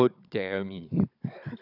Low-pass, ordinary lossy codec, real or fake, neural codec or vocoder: 5.4 kHz; none; fake; codec, 16 kHz, 16 kbps, FunCodec, trained on Chinese and English, 50 frames a second